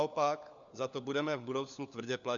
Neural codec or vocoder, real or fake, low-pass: codec, 16 kHz, 4 kbps, FunCodec, trained on LibriTTS, 50 frames a second; fake; 7.2 kHz